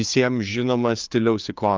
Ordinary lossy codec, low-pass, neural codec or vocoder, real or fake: Opus, 24 kbps; 7.2 kHz; codec, 16 kHz, 4 kbps, FreqCodec, larger model; fake